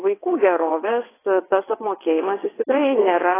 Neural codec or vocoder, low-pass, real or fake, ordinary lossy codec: vocoder, 22.05 kHz, 80 mel bands, WaveNeXt; 3.6 kHz; fake; AAC, 16 kbps